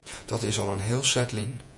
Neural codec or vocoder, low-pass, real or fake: vocoder, 48 kHz, 128 mel bands, Vocos; 10.8 kHz; fake